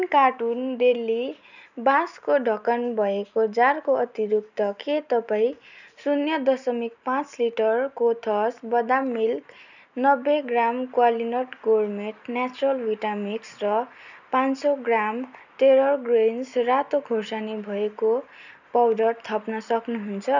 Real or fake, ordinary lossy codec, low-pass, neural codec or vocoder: real; none; 7.2 kHz; none